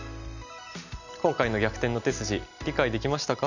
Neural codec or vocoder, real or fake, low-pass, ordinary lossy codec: none; real; 7.2 kHz; none